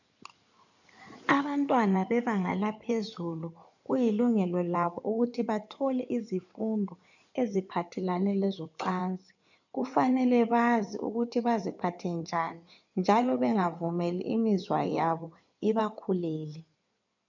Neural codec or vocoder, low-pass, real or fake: codec, 16 kHz in and 24 kHz out, 2.2 kbps, FireRedTTS-2 codec; 7.2 kHz; fake